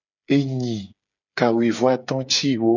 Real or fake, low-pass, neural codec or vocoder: fake; 7.2 kHz; codec, 16 kHz, 8 kbps, FreqCodec, smaller model